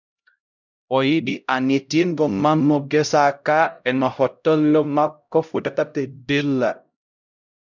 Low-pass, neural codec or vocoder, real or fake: 7.2 kHz; codec, 16 kHz, 0.5 kbps, X-Codec, HuBERT features, trained on LibriSpeech; fake